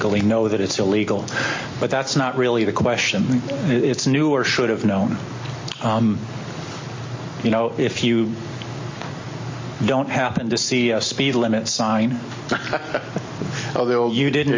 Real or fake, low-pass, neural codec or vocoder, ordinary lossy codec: real; 7.2 kHz; none; MP3, 32 kbps